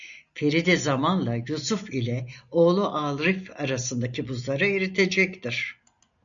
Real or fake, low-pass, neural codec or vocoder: real; 7.2 kHz; none